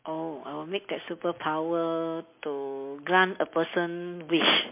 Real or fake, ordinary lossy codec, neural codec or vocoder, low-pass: real; MP3, 24 kbps; none; 3.6 kHz